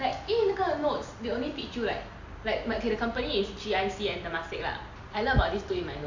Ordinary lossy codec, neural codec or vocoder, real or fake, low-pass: AAC, 48 kbps; vocoder, 44.1 kHz, 128 mel bands every 256 samples, BigVGAN v2; fake; 7.2 kHz